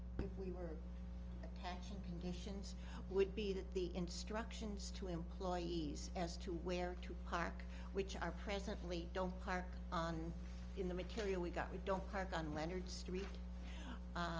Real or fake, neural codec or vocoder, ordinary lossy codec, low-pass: real; none; Opus, 24 kbps; 7.2 kHz